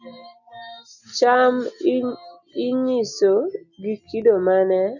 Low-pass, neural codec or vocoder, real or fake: 7.2 kHz; none; real